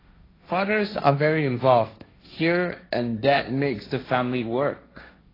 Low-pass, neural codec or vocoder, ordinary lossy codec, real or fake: 5.4 kHz; codec, 16 kHz, 1.1 kbps, Voila-Tokenizer; AAC, 24 kbps; fake